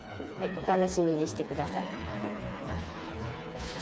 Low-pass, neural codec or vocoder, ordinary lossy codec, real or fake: none; codec, 16 kHz, 4 kbps, FreqCodec, smaller model; none; fake